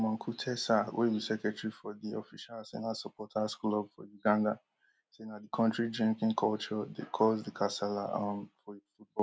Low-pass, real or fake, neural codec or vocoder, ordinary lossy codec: none; real; none; none